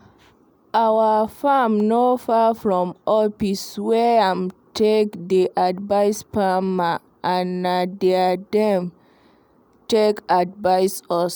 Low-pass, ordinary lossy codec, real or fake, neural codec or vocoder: none; none; real; none